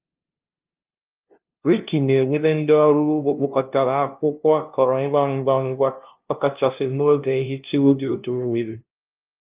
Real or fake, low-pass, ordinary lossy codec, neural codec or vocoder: fake; 3.6 kHz; Opus, 32 kbps; codec, 16 kHz, 0.5 kbps, FunCodec, trained on LibriTTS, 25 frames a second